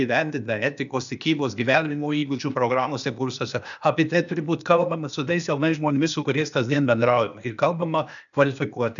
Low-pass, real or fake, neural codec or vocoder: 7.2 kHz; fake; codec, 16 kHz, 0.8 kbps, ZipCodec